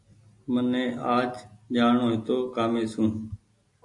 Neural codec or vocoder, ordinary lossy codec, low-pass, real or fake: none; AAC, 48 kbps; 10.8 kHz; real